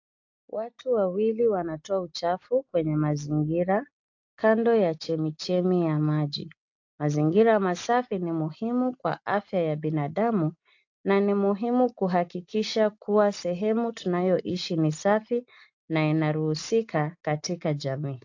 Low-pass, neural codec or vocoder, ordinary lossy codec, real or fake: 7.2 kHz; none; AAC, 48 kbps; real